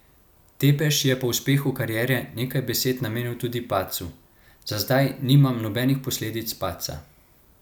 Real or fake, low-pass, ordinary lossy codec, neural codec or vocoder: real; none; none; none